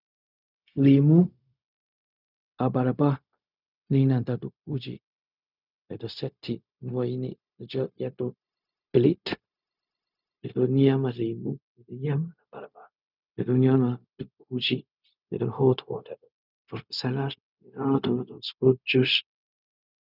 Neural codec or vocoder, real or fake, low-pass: codec, 16 kHz, 0.4 kbps, LongCat-Audio-Codec; fake; 5.4 kHz